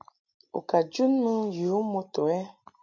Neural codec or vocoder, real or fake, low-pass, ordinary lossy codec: none; real; 7.2 kHz; MP3, 64 kbps